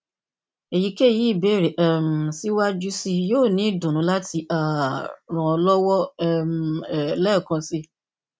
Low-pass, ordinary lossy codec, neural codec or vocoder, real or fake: none; none; none; real